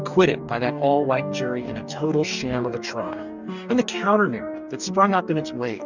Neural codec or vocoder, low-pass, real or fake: codec, 44.1 kHz, 2.6 kbps, DAC; 7.2 kHz; fake